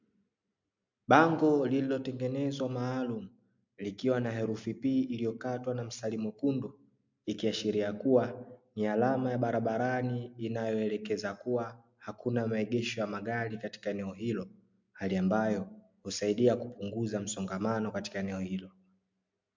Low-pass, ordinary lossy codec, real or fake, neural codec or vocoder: 7.2 kHz; MP3, 64 kbps; real; none